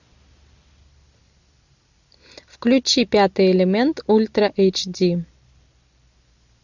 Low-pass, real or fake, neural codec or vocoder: 7.2 kHz; real; none